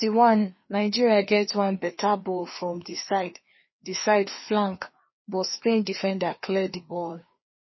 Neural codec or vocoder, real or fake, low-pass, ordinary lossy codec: codec, 16 kHz, 2 kbps, FreqCodec, larger model; fake; 7.2 kHz; MP3, 24 kbps